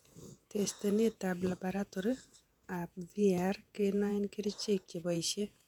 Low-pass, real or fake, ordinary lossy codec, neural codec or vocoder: 19.8 kHz; fake; none; vocoder, 48 kHz, 128 mel bands, Vocos